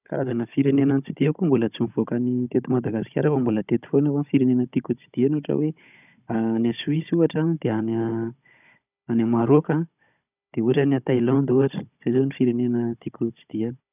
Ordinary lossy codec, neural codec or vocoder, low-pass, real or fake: none; codec, 16 kHz, 16 kbps, FunCodec, trained on Chinese and English, 50 frames a second; 3.6 kHz; fake